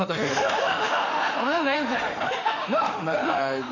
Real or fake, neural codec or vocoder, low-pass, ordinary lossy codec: fake; autoencoder, 48 kHz, 32 numbers a frame, DAC-VAE, trained on Japanese speech; 7.2 kHz; none